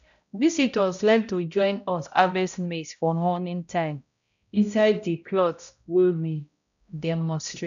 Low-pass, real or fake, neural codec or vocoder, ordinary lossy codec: 7.2 kHz; fake; codec, 16 kHz, 0.5 kbps, X-Codec, HuBERT features, trained on balanced general audio; none